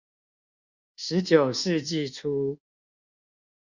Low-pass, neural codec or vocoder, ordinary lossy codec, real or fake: 7.2 kHz; codec, 24 kHz, 1.2 kbps, DualCodec; Opus, 64 kbps; fake